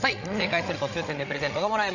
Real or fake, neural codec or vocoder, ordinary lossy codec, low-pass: fake; codec, 16 kHz, 16 kbps, FreqCodec, larger model; none; 7.2 kHz